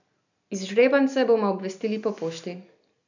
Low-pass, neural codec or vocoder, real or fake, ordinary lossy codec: 7.2 kHz; none; real; none